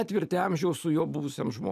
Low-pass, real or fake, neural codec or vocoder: 14.4 kHz; real; none